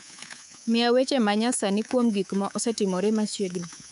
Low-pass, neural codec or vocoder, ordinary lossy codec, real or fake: 10.8 kHz; codec, 24 kHz, 3.1 kbps, DualCodec; none; fake